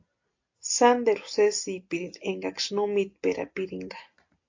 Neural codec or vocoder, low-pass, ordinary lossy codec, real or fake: none; 7.2 kHz; AAC, 48 kbps; real